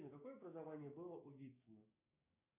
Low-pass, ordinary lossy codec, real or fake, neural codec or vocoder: 3.6 kHz; AAC, 32 kbps; fake; codec, 16 kHz, 6 kbps, DAC